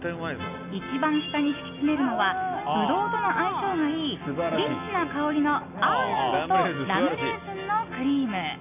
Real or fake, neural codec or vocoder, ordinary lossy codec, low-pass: real; none; none; 3.6 kHz